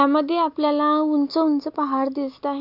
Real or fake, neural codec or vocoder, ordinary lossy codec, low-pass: real; none; none; 5.4 kHz